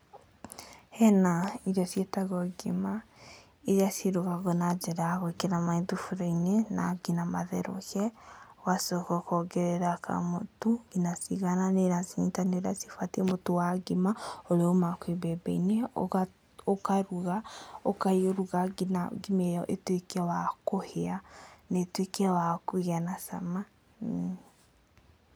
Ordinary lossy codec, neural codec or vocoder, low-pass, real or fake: none; none; none; real